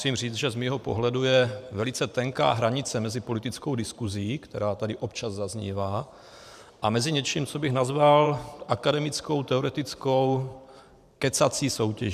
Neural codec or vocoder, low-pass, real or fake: vocoder, 44.1 kHz, 128 mel bands every 512 samples, BigVGAN v2; 14.4 kHz; fake